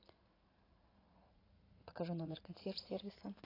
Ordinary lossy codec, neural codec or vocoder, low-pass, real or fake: AAC, 24 kbps; vocoder, 44.1 kHz, 80 mel bands, Vocos; 5.4 kHz; fake